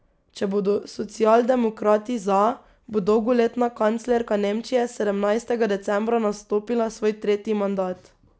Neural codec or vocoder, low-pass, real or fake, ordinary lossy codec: none; none; real; none